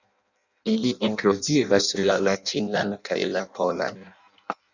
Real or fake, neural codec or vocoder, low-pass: fake; codec, 16 kHz in and 24 kHz out, 0.6 kbps, FireRedTTS-2 codec; 7.2 kHz